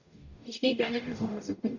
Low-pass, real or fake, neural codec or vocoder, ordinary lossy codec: 7.2 kHz; fake; codec, 44.1 kHz, 0.9 kbps, DAC; none